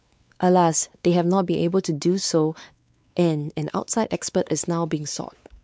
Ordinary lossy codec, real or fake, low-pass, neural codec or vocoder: none; fake; none; codec, 16 kHz, 4 kbps, X-Codec, WavLM features, trained on Multilingual LibriSpeech